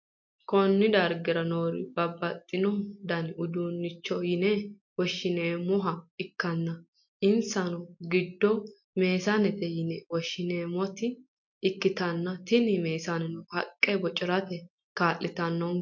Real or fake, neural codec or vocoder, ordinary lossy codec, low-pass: real; none; MP3, 48 kbps; 7.2 kHz